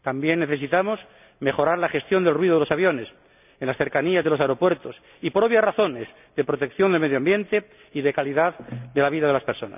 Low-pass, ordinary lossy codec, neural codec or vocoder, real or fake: 3.6 kHz; none; none; real